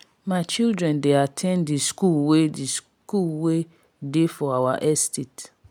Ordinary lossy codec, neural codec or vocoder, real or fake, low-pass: none; none; real; none